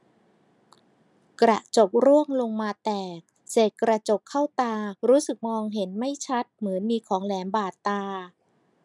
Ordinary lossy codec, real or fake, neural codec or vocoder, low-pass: none; real; none; none